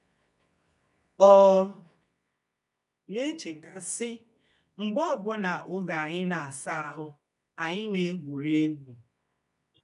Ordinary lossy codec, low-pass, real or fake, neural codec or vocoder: none; 10.8 kHz; fake; codec, 24 kHz, 0.9 kbps, WavTokenizer, medium music audio release